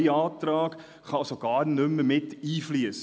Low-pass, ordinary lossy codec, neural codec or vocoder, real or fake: none; none; none; real